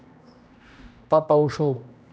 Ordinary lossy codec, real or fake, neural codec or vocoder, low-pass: none; fake; codec, 16 kHz, 1 kbps, X-Codec, HuBERT features, trained on general audio; none